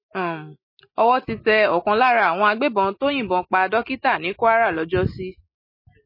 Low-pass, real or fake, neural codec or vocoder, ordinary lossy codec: 5.4 kHz; real; none; MP3, 24 kbps